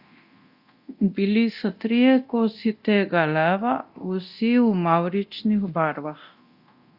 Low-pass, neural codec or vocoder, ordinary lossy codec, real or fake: 5.4 kHz; codec, 24 kHz, 0.9 kbps, DualCodec; Opus, 64 kbps; fake